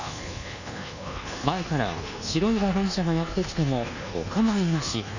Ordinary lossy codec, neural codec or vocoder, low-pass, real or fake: none; codec, 24 kHz, 1.2 kbps, DualCodec; 7.2 kHz; fake